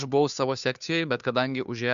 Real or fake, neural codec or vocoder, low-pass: fake; codec, 16 kHz, 2 kbps, FunCodec, trained on Chinese and English, 25 frames a second; 7.2 kHz